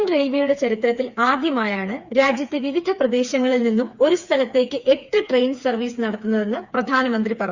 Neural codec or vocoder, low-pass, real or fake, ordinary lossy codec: codec, 16 kHz, 4 kbps, FreqCodec, smaller model; 7.2 kHz; fake; Opus, 64 kbps